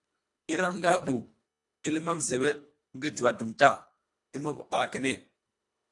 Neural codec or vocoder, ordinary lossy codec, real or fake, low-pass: codec, 24 kHz, 1.5 kbps, HILCodec; AAC, 64 kbps; fake; 10.8 kHz